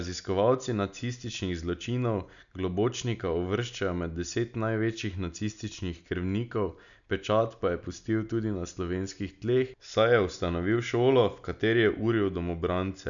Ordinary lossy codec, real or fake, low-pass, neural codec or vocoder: none; real; 7.2 kHz; none